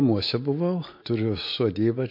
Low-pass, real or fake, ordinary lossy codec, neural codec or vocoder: 5.4 kHz; real; MP3, 32 kbps; none